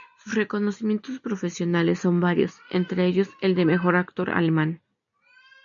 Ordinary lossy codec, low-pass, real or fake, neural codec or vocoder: MP3, 96 kbps; 7.2 kHz; real; none